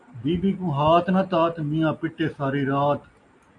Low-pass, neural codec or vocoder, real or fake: 10.8 kHz; none; real